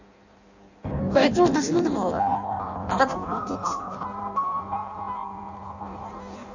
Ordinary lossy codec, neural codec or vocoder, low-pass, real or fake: none; codec, 16 kHz in and 24 kHz out, 0.6 kbps, FireRedTTS-2 codec; 7.2 kHz; fake